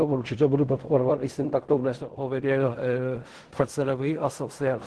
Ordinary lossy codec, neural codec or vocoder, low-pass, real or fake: Opus, 16 kbps; codec, 16 kHz in and 24 kHz out, 0.4 kbps, LongCat-Audio-Codec, fine tuned four codebook decoder; 10.8 kHz; fake